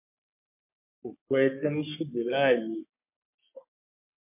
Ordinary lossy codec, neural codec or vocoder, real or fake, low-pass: MP3, 24 kbps; codec, 44.1 kHz, 3.4 kbps, Pupu-Codec; fake; 3.6 kHz